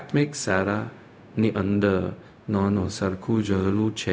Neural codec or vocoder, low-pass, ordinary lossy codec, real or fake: codec, 16 kHz, 0.4 kbps, LongCat-Audio-Codec; none; none; fake